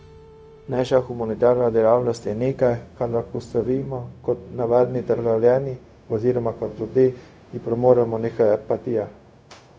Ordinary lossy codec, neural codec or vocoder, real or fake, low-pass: none; codec, 16 kHz, 0.4 kbps, LongCat-Audio-Codec; fake; none